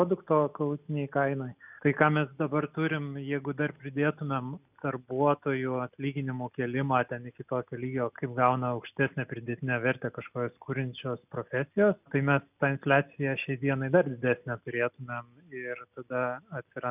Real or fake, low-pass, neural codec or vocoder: real; 3.6 kHz; none